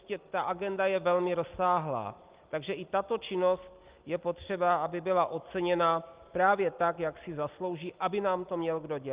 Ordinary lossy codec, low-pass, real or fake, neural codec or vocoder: Opus, 32 kbps; 3.6 kHz; real; none